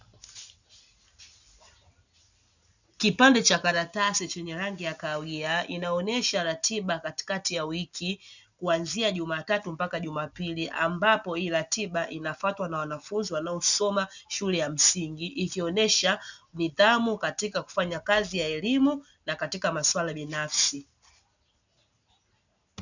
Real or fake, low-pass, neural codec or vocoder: real; 7.2 kHz; none